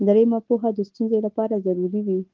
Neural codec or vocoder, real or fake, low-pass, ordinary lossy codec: none; real; 7.2 kHz; Opus, 16 kbps